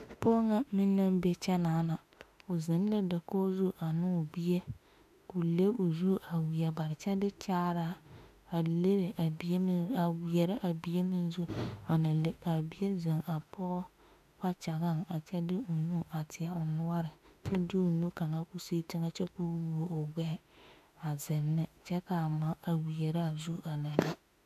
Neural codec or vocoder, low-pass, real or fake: autoencoder, 48 kHz, 32 numbers a frame, DAC-VAE, trained on Japanese speech; 14.4 kHz; fake